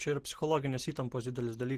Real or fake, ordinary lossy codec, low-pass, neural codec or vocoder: real; Opus, 16 kbps; 14.4 kHz; none